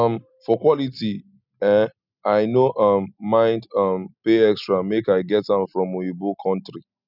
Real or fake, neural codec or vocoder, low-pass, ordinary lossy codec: real; none; 5.4 kHz; none